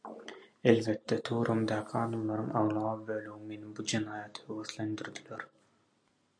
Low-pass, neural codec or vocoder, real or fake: 9.9 kHz; none; real